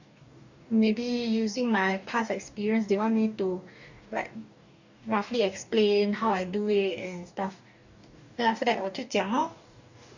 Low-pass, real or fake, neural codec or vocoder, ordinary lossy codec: 7.2 kHz; fake; codec, 44.1 kHz, 2.6 kbps, DAC; none